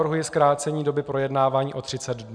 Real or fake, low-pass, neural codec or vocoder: real; 9.9 kHz; none